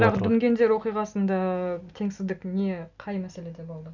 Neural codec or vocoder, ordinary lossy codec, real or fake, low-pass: none; none; real; 7.2 kHz